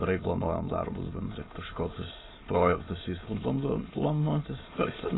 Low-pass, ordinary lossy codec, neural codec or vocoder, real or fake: 7.2 kHz; AAC, 16 kbps; autoencoder, 22.05 kHz, a latent of 192 numbers a frame, VITS, trained on many speakers; fake